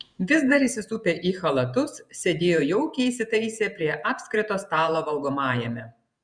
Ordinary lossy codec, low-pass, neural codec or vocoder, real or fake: Opus, 64 kbps; 9.9 kHz; vocoder, 48 kHz, 128 mel bands, Vocos; fake